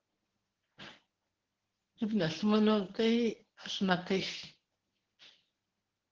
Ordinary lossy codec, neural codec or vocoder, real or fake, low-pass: Opus, 16 kbps; codec, 24 kHz, 0.9 kbps, WavTokenizer, medium speech release version 1; fake; 7.2 kHz